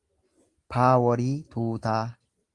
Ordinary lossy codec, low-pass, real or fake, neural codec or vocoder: Opus, 24 kbps; 10.8 kHz; real; none